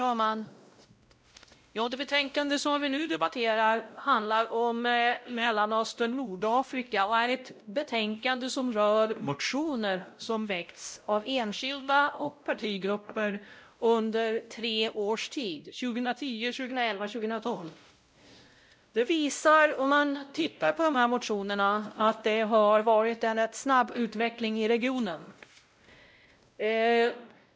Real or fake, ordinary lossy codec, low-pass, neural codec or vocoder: fake; none; none; codec, 16 kHz, 0.5 kbps, X-Codec, WavLM features, trained on Multilingual LibriSpeech